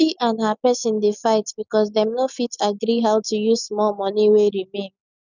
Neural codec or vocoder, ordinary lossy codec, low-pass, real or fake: none; none; 7.2 kHz; real